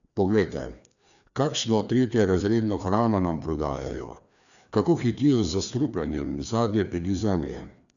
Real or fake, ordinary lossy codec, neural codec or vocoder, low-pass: fake; none; codec, 16 kHz, 2 kbps, FreqCodec, larger model; 7.2 kHz